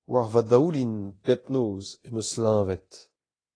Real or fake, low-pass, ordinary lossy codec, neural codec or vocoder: fake; 9.9 kHz; AAC, 32 kbps; codec, 24 kHz, 0.9 kbps, DualCodec